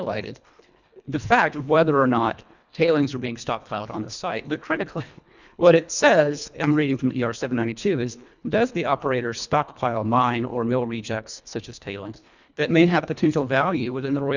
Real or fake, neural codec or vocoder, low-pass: fake; codec, 24 kHz, 1.5 kbps, HILCodec; 7.2 kHz